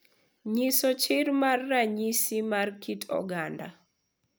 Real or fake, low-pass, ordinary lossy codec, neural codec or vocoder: real; none; none; none